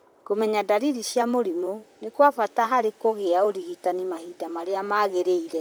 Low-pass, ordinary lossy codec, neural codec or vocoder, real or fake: none; none; vocoder, 44.1 kHz, 128 mel bands, Pupu-Vocoder; fake